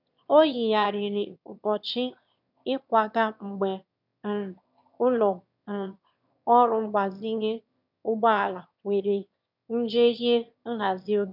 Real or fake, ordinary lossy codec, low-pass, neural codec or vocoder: fake; none; 5.4 kHz; autoencoder, 22.05 kHz, a latent of 192 numbers a frame, VITS, trained on one speaker